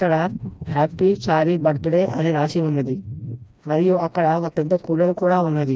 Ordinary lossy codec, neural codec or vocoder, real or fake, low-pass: none; codec, 16 kHz, 1 kbps, FreqCodec, smaller model; fake; none